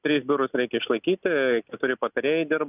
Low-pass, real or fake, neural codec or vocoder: 3.6 kHz; real; none